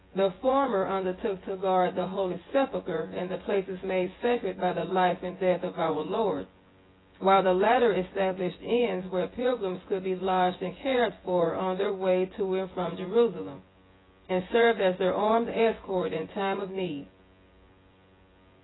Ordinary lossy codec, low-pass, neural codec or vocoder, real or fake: AAC, 16 kbps; 7.2 kHz; vocoder, 24 kHz, 100 mel bands, Vocos; fake